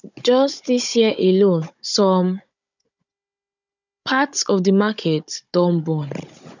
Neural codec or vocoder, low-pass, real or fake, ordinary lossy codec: codec, 16 kHz, 16 kbps, FunCodec, trained on Chinese and English, 50 frames a second; 7.2 kHz; fake; none